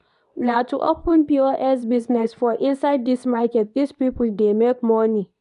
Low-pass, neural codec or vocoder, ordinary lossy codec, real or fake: 10.8 kHz; codec, 24 kHz, 0.9 kbps, WavTokenizer, medium speech release version 2; none; fake